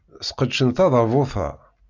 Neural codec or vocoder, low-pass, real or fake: none; 7.2 kHz; real